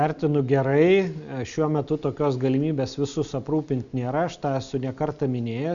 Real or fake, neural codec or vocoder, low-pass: real; none; 7.2 kHz